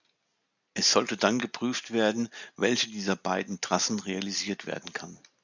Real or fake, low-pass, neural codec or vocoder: real; 7.2 kHz; none